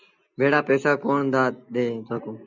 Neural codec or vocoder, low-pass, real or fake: none; 7.2 kHz; real